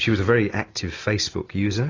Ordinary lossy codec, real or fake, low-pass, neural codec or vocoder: AAC, 32 kbps; real; 7.2 kHz; none